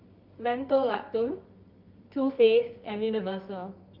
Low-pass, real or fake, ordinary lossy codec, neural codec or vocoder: 5.4 kHz; fake; Opus, 24 kbps; codec, 24 kHz, 0.9 kbps, WavTokenizer, medium music audio release